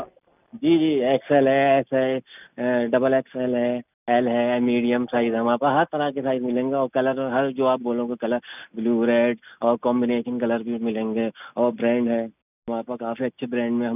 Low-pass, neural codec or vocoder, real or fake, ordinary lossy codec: 3.6 kHz; none; real; none